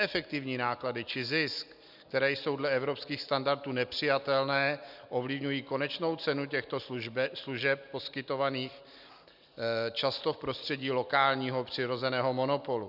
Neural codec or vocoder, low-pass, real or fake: none; 5.4 kHz; real